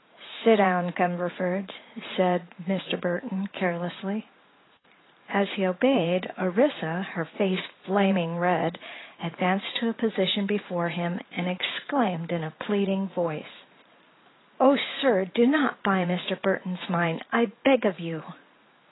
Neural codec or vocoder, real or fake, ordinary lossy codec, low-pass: vocoder, 44.1 kHz, 80 mel bands, Vocos; fake; AAC, 16 kbps; 7.2 kHz